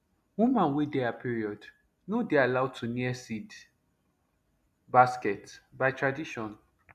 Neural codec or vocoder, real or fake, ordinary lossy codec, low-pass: none; real; none; 14.4 kHz